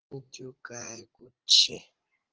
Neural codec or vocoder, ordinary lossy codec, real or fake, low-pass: vocoder, 44.1 kHz, 80 mel bands, Vocos; Opus, 16 kbps; fake; 7.2 kHz